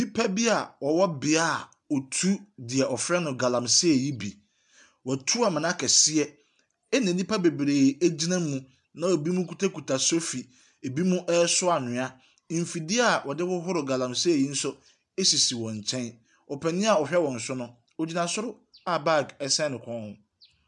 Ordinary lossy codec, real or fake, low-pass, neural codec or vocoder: MP3, 96 kbps; real; 10.8 kHz; none